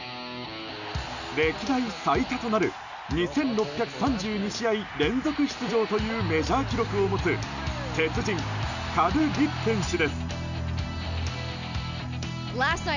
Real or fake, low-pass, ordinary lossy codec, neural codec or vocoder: real; 7.2 kHz; none; none